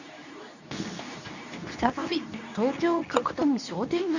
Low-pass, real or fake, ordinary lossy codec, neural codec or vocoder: 7.2 kHz; fake; none; codec, 24 kHz, 0.9 kbps, WavTokenizer, medium speech release version 2